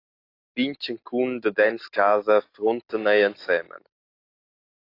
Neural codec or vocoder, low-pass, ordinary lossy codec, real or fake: none; 5.4 kHz; AAC, 32 kbps; real